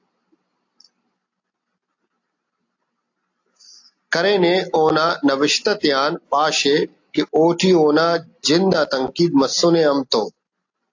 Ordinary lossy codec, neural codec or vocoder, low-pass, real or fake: AAC, 48 kbps; none; 7.2 kHz; real